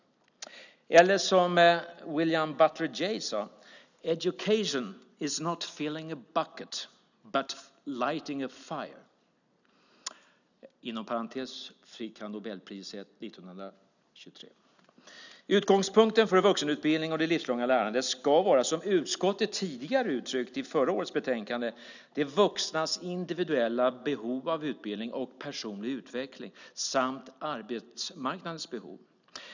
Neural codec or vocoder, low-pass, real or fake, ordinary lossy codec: none; 7.2 kHz; real; none